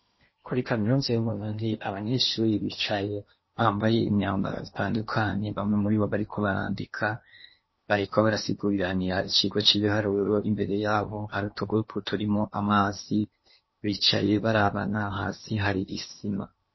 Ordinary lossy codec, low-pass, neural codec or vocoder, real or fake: MP3, 24 kbps; 7.2 kHz; codec, 16 kHz in and 24 kHz out, 0.8 kbps, FocalCodec, streaming, 65536 codes; fake